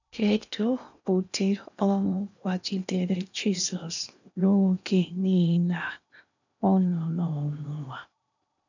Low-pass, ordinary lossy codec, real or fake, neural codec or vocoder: 7.2 kHz; none; fake; codec, 16 kHz in and 24 kHz out, 0.8 kbps, FocalCodec, streaming, 65536 codes